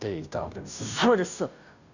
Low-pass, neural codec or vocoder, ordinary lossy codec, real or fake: 7.2 kHz; codec, 16 kHz, 0.5 kbps, FunCodec, trained on Chinese and English, 25 frames a second; none; fake